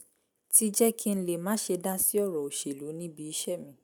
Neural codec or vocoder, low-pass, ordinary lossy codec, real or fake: none; none; none; real